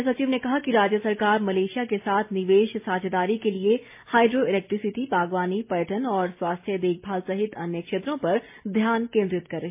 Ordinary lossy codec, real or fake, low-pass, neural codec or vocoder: MP3, 24 kbps; real; 3.6 kHz; none